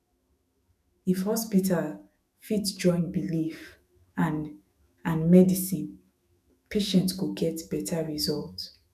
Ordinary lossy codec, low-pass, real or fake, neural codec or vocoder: none; 14.4 kHz; fake; autoencoder, 48 kHz, 128 numbers a frame, DAC-VAE, trained on Japanese speech